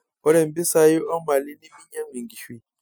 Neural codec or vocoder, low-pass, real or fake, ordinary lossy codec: none; none; real; none